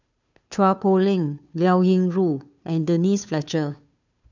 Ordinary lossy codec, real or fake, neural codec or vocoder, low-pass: none; fake; codec, 16 kHz, 2 kbps, FunCodec, trained on Chinese and English, 25 frames a second; 7.2 kHz